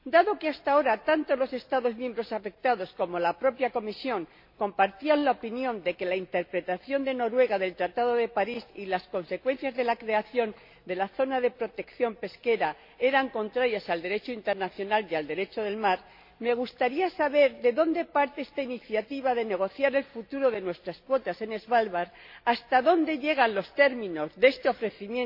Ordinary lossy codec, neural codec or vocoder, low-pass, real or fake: MP3, 32 kbps; none; 5.4 kHz; real